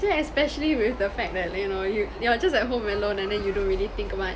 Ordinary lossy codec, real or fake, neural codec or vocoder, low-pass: none; real; none; none